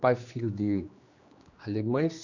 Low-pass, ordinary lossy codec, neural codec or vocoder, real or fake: 7.2 kHz; Opus, 64 kbps; codec, 16 kHz, 2 kbps, X-Codec, HuBERT features, trained on general audio; fake